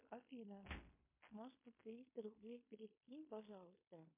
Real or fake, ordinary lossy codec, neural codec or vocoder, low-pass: fake; MP3, 24 kbps; codec, 16 kHz in and 24 kHz out, 0.9 kbps, LongCat-Audio-Codec, fine tuned four codebook decoder; 3.6 kHz